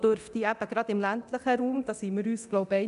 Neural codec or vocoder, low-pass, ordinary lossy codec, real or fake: codec, 24 kHz, 0.9 kbps, DualCodec; 10.8 kHz; none; fake